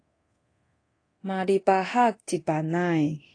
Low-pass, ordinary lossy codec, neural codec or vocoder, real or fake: 9.9 kHz; AAC, 32 kbps; codec, 24 kHz, 0.9 kbps, DualCodec; fake